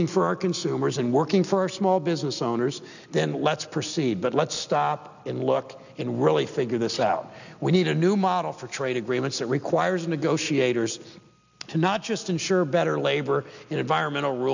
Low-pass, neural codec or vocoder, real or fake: 7.2 kHz; none; real